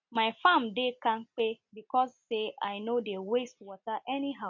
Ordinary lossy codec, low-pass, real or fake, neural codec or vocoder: MP3, 48 kbps; 7.2 kHz; real; none